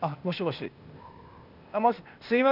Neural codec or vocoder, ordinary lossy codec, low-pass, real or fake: codec, 16 kHz, 0.8 kbps, ZipCodec; none; 5.4 kHz; fake